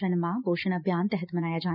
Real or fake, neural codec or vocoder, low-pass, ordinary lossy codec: real; none; 5.4 kHz; none